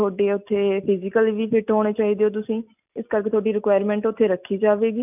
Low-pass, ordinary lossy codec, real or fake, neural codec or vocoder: 3.6 kHz; none; real; none